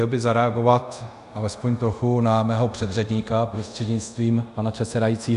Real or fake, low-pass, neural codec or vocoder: fake; 10.8 kHz; codec, 24 kHz, 0.5 kbps, DualCodec